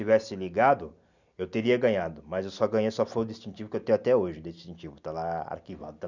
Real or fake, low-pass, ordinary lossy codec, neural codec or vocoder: real; 7.2 kHz; none; none